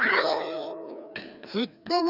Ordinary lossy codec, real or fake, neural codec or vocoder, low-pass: none; fake; codec, 16 kHz, 2 kbps, FreqCodec, larger model; 5.4 kHz